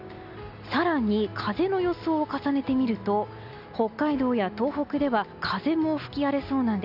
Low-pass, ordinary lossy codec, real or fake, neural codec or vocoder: 5.4 kHz; none; real; none